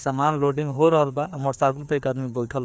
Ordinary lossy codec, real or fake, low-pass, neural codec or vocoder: none; fake; none; codec, 16 kHz, 2 kbps, FreqCodec, larger model